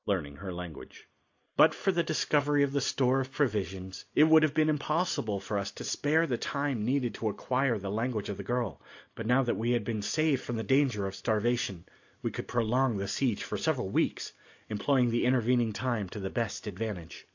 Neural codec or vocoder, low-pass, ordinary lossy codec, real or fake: none; 7.2 kHz; AAC, 48 kbps; real